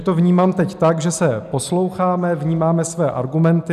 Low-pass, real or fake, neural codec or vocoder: 14.4 kHz; real; none